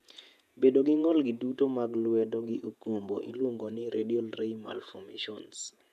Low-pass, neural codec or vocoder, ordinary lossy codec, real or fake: 14.4 kHz; vocoder, 48 kHz, 128 mel bands, Vocos; none; fake